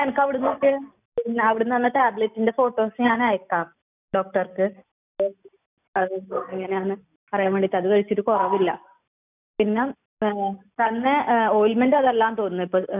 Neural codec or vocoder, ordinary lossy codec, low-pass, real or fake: none; none; 3.6 kHz; real